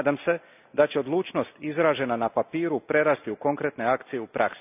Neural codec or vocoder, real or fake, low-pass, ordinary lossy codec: none; real; 3.6 kHz; none